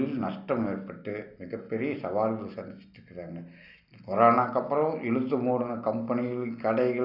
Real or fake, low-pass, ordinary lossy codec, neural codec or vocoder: real; 5.4 kHz; none; none